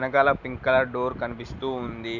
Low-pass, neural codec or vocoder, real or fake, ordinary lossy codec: 7.2 kHz; none; real; none